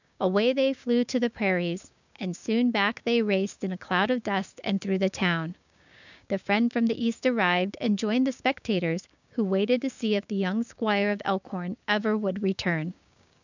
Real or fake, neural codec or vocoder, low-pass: fake; codec, 16 kHz, 6 kbps, DAC; 7.2 kHz